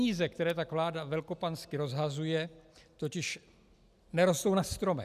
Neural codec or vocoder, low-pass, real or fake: none; 14.4 kHz; real